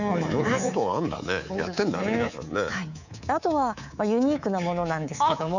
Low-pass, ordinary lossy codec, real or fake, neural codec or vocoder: 7.2 kHz; none; fake; codec, 24 kHz, 3.1 kbps, DualCodec